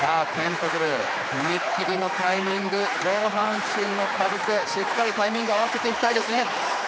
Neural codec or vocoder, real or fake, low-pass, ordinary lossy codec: codec, 16 kHz, 4 kbps, X-Codec, HuBERT features, trained on general audio; fake; none; none